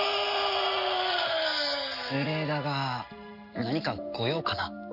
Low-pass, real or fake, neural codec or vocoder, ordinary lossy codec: 5.4 kHz; real; none; none